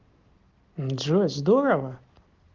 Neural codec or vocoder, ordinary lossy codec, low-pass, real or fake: none; Opus, 16 kbps; 7.2 kHz; real